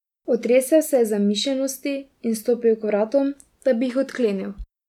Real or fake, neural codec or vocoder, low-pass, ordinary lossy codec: real; none; 19.8 kHz; none